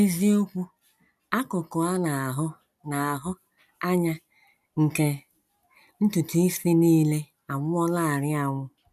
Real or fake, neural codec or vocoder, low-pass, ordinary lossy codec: real; none; 14.4 kHz; none